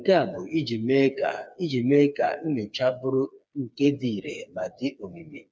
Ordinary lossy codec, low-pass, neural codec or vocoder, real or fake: none; none; codec, 16 kHz, 4 kbps, FreqCodec, smaller model; fake